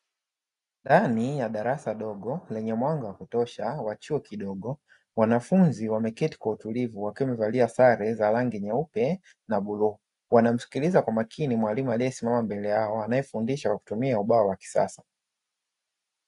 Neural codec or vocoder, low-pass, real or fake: none; 10.8 kHz; real